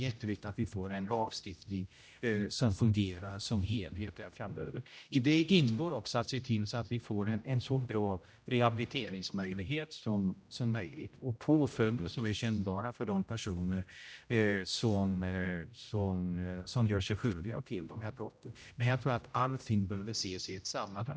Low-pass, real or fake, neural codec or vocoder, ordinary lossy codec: none; fake; codec, 16 kHz, 0.5 kbps, X-Codec, HuBERT features, trained on general audio; none